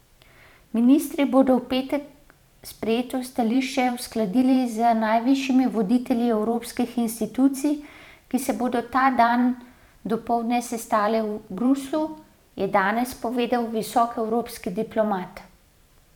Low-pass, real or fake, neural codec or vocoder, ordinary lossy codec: 19.8 kHz; fake; vocoder, 44.1 kHz, 128 mel bands every 512 samples, BigVGAN v2; none